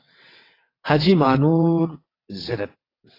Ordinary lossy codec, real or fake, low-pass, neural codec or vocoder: AAC, 24 kbps; fake; 5.4 kHz; vocoder, 22.05 kHz, 80 mel bands, WaveNeXt